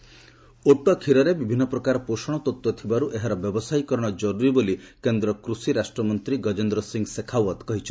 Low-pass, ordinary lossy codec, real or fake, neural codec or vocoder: none; none; real; none